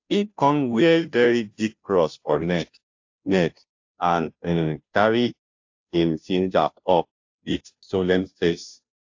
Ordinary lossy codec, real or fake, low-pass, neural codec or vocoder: AAC, 48 kbps; fake; 7.2 kHz; codec, 16 kHz, 0.5 kbps, FunCodec, trained on Chinese and English, 25 frames a second